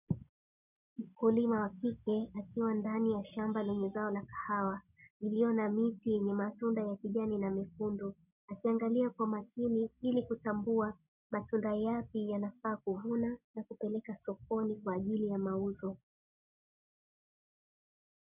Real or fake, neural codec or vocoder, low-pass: real; none; 3.6 kHz